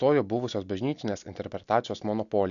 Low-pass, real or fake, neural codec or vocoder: 7.2 kHz; real; none